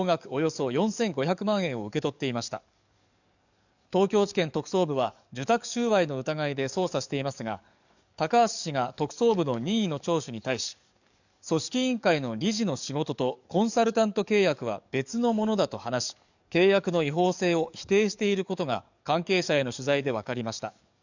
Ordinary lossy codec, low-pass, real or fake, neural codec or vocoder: none; 7.2 kHz; fake; codec, 44.1 kHz, 7.8 kbps, DAC